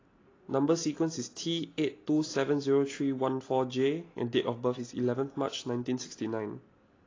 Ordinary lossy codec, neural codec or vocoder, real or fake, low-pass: AAC, 32 kbps; none; real; 7.2 kHz